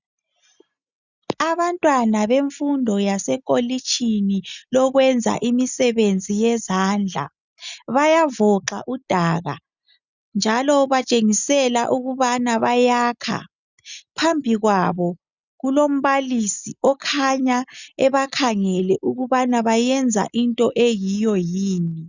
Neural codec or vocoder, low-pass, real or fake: none; 7.2 kHz; real